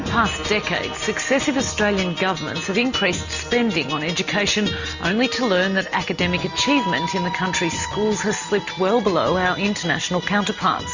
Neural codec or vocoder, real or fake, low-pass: none; real; 7.2 kHz